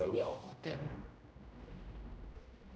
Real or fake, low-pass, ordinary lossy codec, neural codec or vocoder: fake; none; none; codec, 16 kHz, 0.5 kbps, X-Codec, HuBERT features, trained on general audio